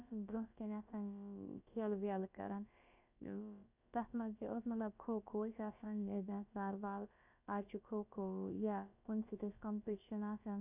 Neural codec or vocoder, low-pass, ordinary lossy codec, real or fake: codec, 16 kHz, about 1 kbps, DyCAST, with the encoder's durations; 3.6 kHz; none; fake